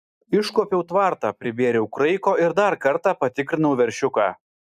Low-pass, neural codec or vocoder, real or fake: 14.4 kHz; none; real